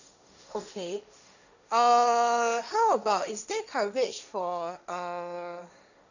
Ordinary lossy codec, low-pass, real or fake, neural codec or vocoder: none; 7.2 kHz; fake; codec, 16 kHz, 1.1 kbps, Voila-Tokenizer